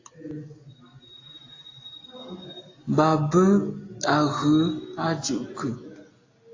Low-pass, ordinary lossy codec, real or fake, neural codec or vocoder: 7.2 kHz; AAC, 32 kbps; real; none